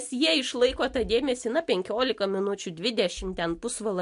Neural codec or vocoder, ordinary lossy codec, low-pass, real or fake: none; MP3, 64 kbps; 10.8 kHz; real